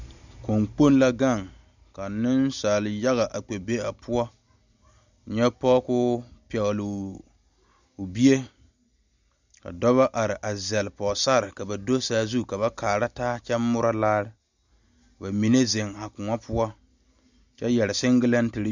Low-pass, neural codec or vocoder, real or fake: 7.2 kHz; none; real